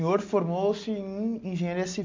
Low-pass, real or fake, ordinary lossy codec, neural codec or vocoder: 7.2 kHz; real; MP3, 48 kbps; none